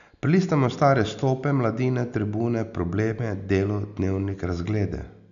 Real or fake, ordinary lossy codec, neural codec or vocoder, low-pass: real; none; none; 7.2 kHz